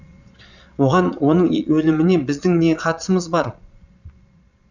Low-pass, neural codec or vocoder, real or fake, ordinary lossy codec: 7.2 kHz; vocoder, 22.05 kHz, 80 mel bands, Vocos; fake; none